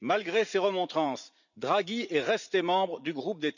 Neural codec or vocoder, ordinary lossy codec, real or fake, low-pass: vocoder, 44.1 kHz, 80 mel bands, Vocos; none; fake; 7.2 kHz